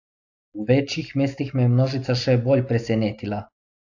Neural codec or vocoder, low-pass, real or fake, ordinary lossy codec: none; 7.2 kHz; real; AAC, 48 kbps